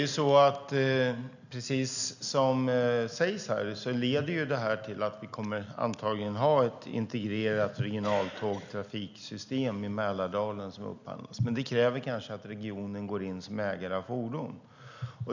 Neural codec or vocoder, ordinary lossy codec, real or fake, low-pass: none; none; real; 7.2 kHz